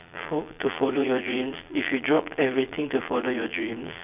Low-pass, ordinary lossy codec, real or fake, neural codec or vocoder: 3.6 kHz; none; fake; vocoder, 22.05 kHz, 80 mel bands, Vocos